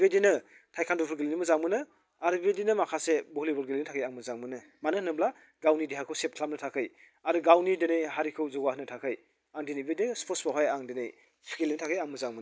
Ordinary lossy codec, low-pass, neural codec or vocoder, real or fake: none; none; none; real